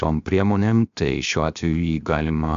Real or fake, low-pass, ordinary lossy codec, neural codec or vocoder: fake; 7.2 kHz; MP3, 64 kbps; codec, 16 kHz, 0.7 kbps, FocalCodec